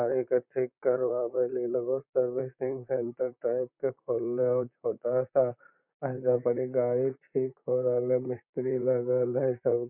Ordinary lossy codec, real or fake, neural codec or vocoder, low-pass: none; fake; vocoder, 44.1 kHz, 128 mel bands, Pupu-Vocoder; 3.6 kHz